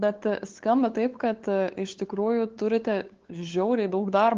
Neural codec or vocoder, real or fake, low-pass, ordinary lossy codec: codec, 16 kHz, 8 kbps, FunCodec, trained on LibriTTS, 25 frames a second; fake; 7.2 kHz; Opus, 16 kbps